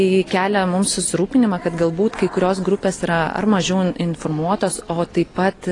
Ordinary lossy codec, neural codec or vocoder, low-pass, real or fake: AAC, 32 kbps; none; 10.8 kHz; real